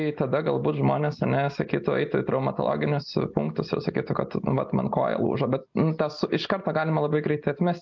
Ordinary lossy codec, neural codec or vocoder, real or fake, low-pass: MP3, 64 kbps; none; real; 7.2 kHz